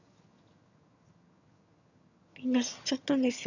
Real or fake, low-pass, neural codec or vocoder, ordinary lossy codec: fake; 7.2 kHz; vocoder, 22.05 kHz, 80 mel bands, HiFi-GAN; none